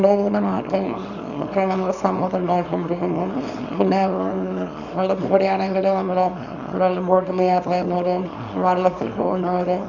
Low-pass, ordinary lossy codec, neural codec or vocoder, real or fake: 7.2 kHz; none; codec, 24 kHz, 0.9 kbps, WavTokenizer, small release; fake